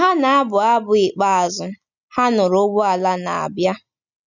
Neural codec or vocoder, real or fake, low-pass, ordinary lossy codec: none; real; 7.2 kHz; none